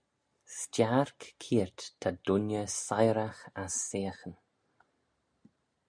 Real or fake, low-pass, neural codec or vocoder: real; 9.9 kHz; none